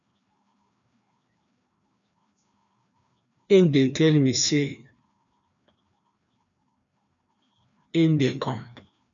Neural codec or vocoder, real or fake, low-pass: codec, 16 kHz, 2 kbps, FreqCodec, larger model; fake; 7.2 kHz